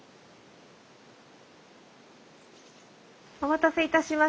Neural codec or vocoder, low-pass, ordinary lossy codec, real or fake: none; none; none; real